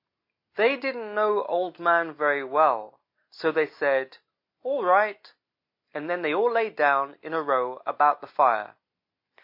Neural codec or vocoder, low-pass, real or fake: none; 5.4 kHz; real